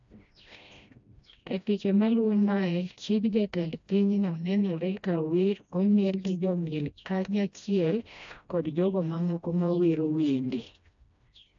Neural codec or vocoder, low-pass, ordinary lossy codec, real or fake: codec, 16 kHz, 1 kbps, FreqCodec, smaller model; 7.2 kHz; AAC, 64 kbps; fake